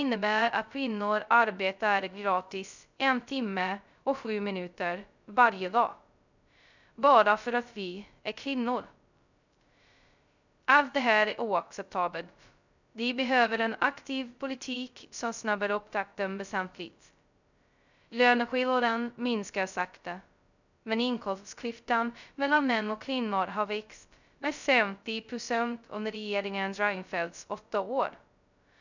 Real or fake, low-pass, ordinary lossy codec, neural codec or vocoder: fake; 7.2 kHz; none; codec, 16 kHz, 0.2 kbps, FocalCodec